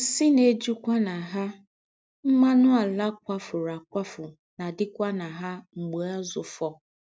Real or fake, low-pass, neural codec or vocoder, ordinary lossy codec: real; none; none; none